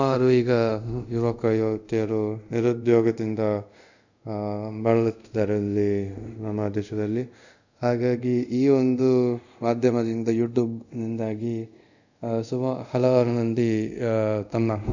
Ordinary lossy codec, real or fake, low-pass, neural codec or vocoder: none; fake; 7.2 kHz; codec, 24 kHz, 0.5 kbps, DualCodec